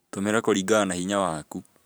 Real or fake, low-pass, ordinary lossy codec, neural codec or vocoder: fake; none; none; vocoder, 44.1 kHz, 128 mel bands every 512 samples, BigVGAN v2